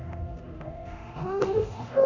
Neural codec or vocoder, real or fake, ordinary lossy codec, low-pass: codec, 24 kHz, 0.9 kbps, DualCodec; fake; none; 7.2 kHz